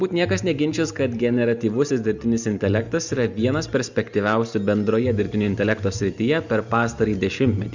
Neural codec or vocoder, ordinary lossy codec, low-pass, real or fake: none; Opus, 64 kbps; 7.2 kHz; real